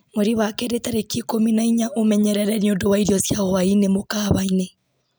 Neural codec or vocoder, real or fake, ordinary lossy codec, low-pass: none; real; none; none